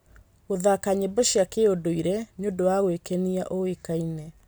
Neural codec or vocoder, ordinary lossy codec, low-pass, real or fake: none; none; none; real